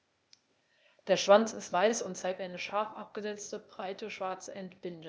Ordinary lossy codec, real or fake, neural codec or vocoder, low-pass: none; fake; codec, 16 kHz, 0.8 kbps, ZipCodec; none